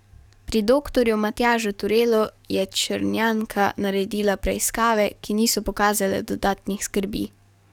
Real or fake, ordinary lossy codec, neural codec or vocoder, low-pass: fake; none; codec, 44.1 kHz, 7.8 kbps, DAC; 19.8 kHz